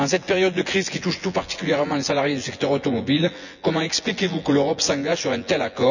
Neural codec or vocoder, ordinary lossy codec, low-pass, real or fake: vocoder, 24 kHz, 100 mel bands, Vocos; none; 7.2 kHz; fake